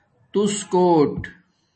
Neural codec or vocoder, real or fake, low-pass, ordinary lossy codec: none; real; 10.8 kHz; MP3, 32 kbps